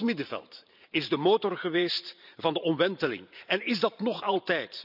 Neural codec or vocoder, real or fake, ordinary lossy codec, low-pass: vocoder, 44.1 kHz, 128 mel bands every 512 samples, BigVGAN v2; fake; none; 5.4 kHz